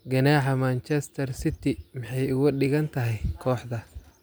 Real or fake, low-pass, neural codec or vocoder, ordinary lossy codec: real; none; none; none